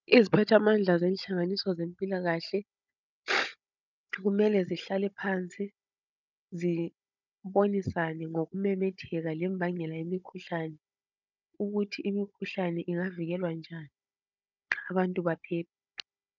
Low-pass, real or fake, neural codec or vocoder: 7.2 kHz; fake; codec, 16 kHz, 16 kbps, FunCodec, trained on Chinese and English, 50 frames a second